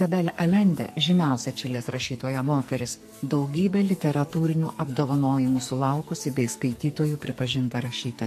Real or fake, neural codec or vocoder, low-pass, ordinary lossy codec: fake; codec, 44.1 kHz, 2.6 kbps, SNAC; 14.4 kHz; MP3, 64 kbps